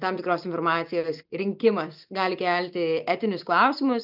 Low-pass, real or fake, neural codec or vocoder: 5.4 kHz; real; none